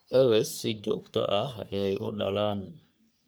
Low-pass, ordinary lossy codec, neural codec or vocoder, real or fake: none; none; codec, 44.1 kHz, 3.4 kbps, Pupu-Codec; fake